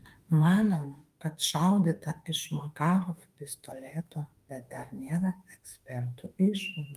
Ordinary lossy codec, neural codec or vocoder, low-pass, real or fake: Opus, 32 kbps; autoencoder, 48 kHz, 32 numbers a frame, DAC-VAE, trained on Japanese speech; 14.4 kHz; fake